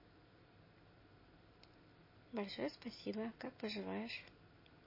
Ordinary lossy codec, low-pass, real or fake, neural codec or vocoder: MP3, 24 kbps; 5.4 kHz; real; none